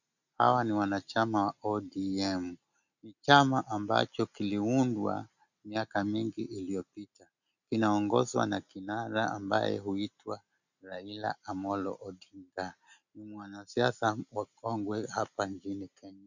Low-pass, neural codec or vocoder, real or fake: 7.2 kHz; none; real